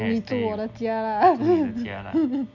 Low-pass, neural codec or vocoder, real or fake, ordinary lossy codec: 7.2 kHz; none; real; none